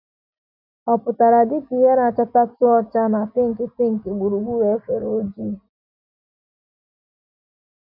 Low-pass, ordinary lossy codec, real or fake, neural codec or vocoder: 5.4 kHz; none; real; none